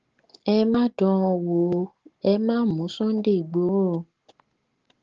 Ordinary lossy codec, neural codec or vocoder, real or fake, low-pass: Opus, 16 kbps; none; real; 7.2 kHz